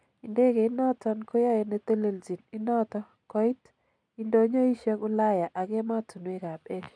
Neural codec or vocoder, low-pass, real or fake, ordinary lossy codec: none; 9.9 kHz; real; none